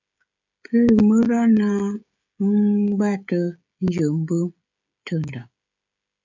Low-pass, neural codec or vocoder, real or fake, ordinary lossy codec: 7.2 kHz; codec, 16 kHz, 16 kbps, FreqCodec, smaller model; fake; MP3, 64 kbps